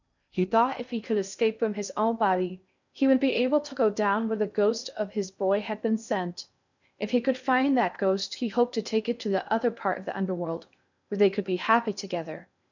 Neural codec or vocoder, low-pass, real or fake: codec, 16 kHz in and 24 kHz out, 0.6 kbps, FocalCodec, streaming, 2048 codes; 7.2 kHz; fake